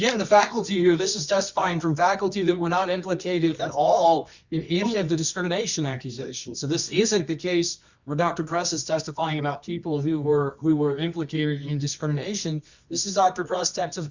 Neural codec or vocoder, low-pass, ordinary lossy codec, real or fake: codec, 24 kHz, 0.9 kbps, WavTokenizer, medium music audio release; 7.2 kHz; Opus, 64 kbps; fake